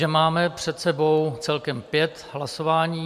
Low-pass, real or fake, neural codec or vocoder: 14.4 kHz; fake; vocoder, 44.1 kHz, 128 mel bands every 512 samples, BigVGAN v2